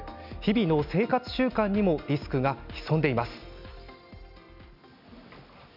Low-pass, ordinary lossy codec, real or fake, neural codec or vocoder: 5.4 kHz; none; real; none